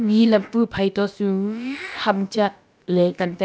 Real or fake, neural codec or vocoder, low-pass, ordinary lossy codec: fake; codec, 16 kHz, about 1 kbps, DyCAST, with the encoder's durations; none; none